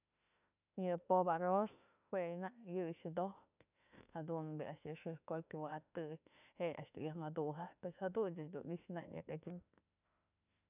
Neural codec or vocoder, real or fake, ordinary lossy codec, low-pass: autoencoder, 48 kHz, 32 numbers a frame, DAC-VAE, trained on Japanese speech; fake; none; 3.6 kHz